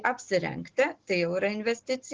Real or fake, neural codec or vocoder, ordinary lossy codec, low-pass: real; none; Opus, 16 kbps; 7.2 kHz